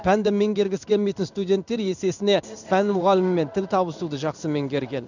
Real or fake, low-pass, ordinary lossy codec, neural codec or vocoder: fake; 7.2 kHz; none; codec, 16 kHz in and 24 kHz out, 1 kbps, XY-Tokenizer